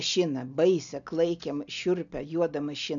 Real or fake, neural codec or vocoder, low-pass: real; none; 7.2 kHz